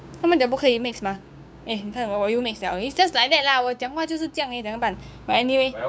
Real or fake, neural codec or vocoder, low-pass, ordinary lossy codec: fake; codec, 16 kHz, 6 kbps, DAC; none; none